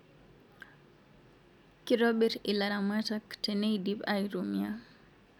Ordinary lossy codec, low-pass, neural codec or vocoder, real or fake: none; 19.8 kHz; none; real